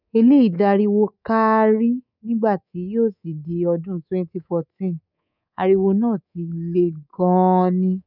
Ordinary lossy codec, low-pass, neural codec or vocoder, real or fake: none; 5.4 kHz; codec, 24 kHz, 3.1 kbps, DualCodec; fake